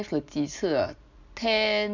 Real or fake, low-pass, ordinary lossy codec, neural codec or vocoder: real; 7.2 kHz; none; none